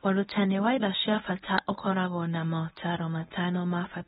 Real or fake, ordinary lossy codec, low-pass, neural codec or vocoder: fake; AAC, 16 kbps; 10.8 kHz; codec, 24 kHz, 0.9 kbps, WavTokenizer, medium speech release version 1